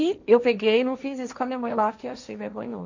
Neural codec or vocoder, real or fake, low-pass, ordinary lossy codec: codec, 16 kHz, 1.1 kbps, Voila-Tokenizer; fake; 7.2 kHz; none